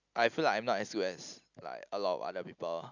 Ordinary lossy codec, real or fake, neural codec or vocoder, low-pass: none; real; none; 7.2 kHz